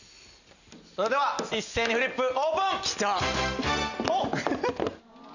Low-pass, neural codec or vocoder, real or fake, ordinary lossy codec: 7.2 kHz; none; real; none